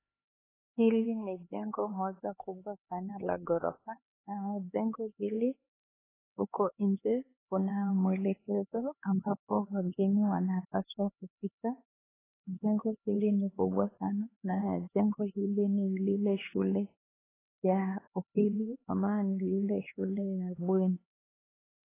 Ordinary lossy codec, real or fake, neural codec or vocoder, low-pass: AAC, 16 kbps; fake; codec, 16 kHz, 4 kbps, X-Codec, HuBERT features, trained on LibriSpeech; 3.6 kHz